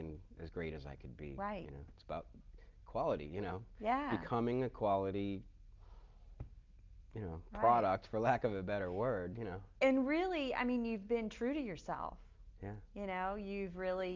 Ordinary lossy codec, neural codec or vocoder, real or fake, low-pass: Opus, 24 kbps; none; real; 7.2 kHz